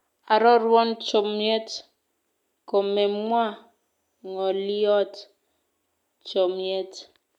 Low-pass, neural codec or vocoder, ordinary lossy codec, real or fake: 19.8 kHz; none; none; real